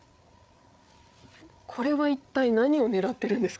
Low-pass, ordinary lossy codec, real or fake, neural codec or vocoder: none; none; fake; codec, 16 kHz, 8 kbps, FreqCodec, larger model